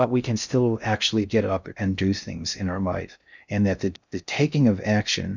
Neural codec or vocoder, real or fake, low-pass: codec, 16 kHz in and 24 kHz out, 0.6 kbps, FocalCodec, streaming, 2048 codes; fake; 7.2 kHz